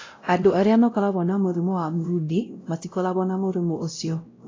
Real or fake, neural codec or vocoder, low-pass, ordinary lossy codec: fake; codec, 16 kHz, 0.5 kbps, X-Codec, WavLM features, trained on Multilingual LibriSpeech; 7.2 kHz; AAC, 32 kbps